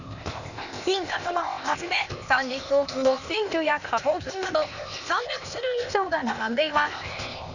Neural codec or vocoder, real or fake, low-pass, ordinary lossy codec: codec, 16 kHz, 0.8 kbps, ZipCodec; fake; 7.2 kHz; none